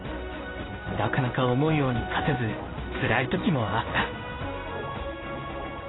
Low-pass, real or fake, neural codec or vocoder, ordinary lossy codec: 7.2 kHz; fake; codec, 16 kHz in and 24 kHz out, 1 kbps, XY-Tokenizer; AAC, 16 kbps